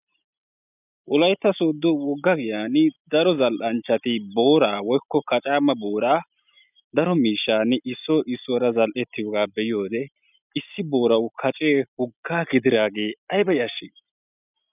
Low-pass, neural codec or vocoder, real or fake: 3.6 kHz; none; real